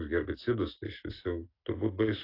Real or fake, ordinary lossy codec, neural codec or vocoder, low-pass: real; AAC, 32 kbps; none; 5.4 kHz